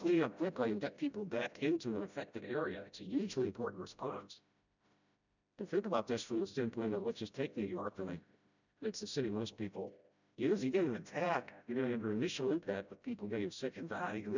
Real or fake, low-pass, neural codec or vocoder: fake; 7.2 kHz; codec, 16 kHz, 0.5 kbps, FreqCodec, smaller model